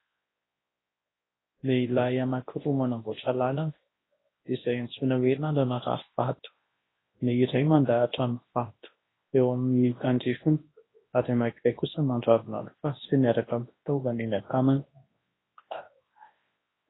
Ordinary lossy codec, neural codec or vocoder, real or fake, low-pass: AAC, 16 kbps; codec, 24 kHz, 0.9 kbps, WavTokenizer, large speech release; fake; 7.2 kHz